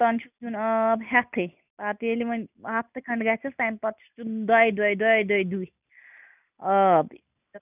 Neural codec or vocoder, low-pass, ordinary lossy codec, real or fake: none; 3.6 kHz; none; real